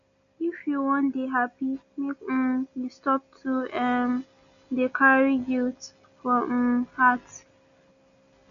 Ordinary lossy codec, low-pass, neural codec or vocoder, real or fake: none; 7.2 kHz; none; real